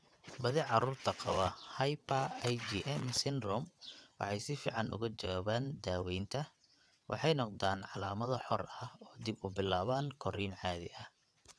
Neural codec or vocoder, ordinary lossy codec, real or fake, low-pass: vocoder, 22.05 kHz, 80 mel bands, WaveNeXt; none; fake; none